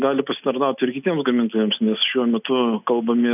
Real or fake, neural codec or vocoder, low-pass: real; none; 3.6 kHz